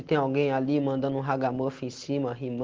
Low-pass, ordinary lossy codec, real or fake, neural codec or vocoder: 7.2 kHz; Opus, 16 kbps; real; none